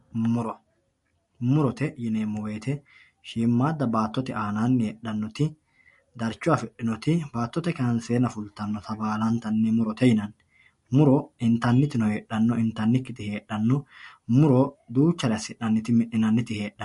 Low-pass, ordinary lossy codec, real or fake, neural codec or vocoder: 14.4 kHz; MP3, 48 kbps; real; none